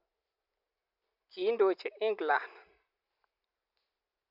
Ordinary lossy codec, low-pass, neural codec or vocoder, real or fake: none; 5.4 kHz; none; real